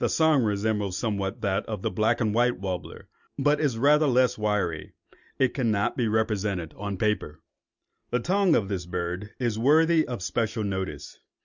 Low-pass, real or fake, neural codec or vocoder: 7.2 kHz; real; none